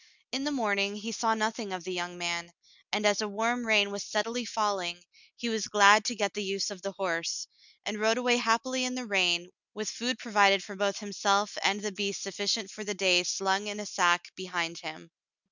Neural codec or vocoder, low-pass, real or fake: none; 7.2 kHz; real